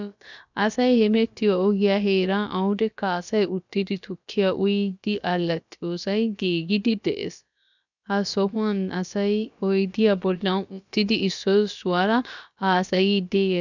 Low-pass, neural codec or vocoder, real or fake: 7.2 kHz; codec, 16 kHz, about 1 kbps, DyCAST, with the encoder's durations; fake